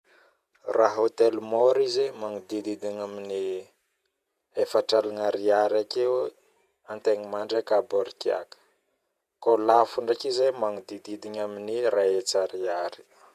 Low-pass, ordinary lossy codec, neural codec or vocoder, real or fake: 14.4 kHz; none; vocoder, 48 kHz, 128 mel bands, Vocos; fake